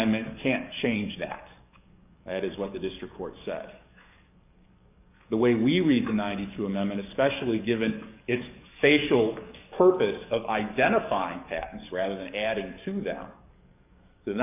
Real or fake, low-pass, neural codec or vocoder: fake; 3.6 kHz; codec, 44.1 kHz, 7.8 kbps, DAC